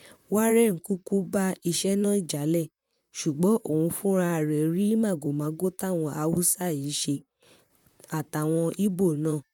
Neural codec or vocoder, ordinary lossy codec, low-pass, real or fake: vocoder, 44.1 kHz, 128 mel bands, Pupu-Vocoder; none; 19.8 kHz; fake